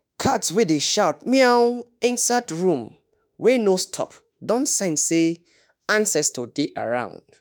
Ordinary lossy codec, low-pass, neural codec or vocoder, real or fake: none; none; autoencoder, 48 kHz, 32 numbers a frame, DAC-VAE, trained on Japanese speech; fake